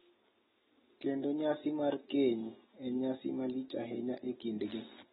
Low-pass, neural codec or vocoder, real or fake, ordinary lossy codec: 7.2 kHz; none; real; AAC, 16 kbps